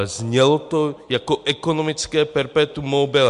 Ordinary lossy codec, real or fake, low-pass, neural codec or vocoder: MP3, 64 kbps; real; 10.8 kHz; none